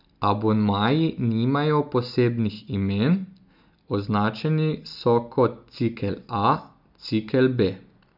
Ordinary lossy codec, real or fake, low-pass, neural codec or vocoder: none; real; 5.4 kHz; none